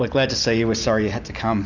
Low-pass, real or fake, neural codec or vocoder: 7.2 kHz; real; none